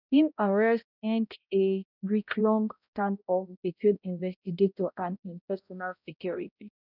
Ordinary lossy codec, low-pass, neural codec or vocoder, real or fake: none; 5.4 kHz; codec, 16 kHz, 0.5 kbps, X-Codec, HuBERT features, trained on balanced general audio; fake